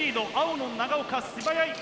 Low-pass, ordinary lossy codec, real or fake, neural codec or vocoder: none; none; real; none